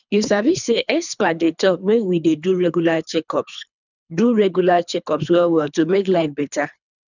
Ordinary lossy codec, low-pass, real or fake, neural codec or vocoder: none; 7.2 kHz; fake; codec, 24 kHz, 3 kbps, HILCodec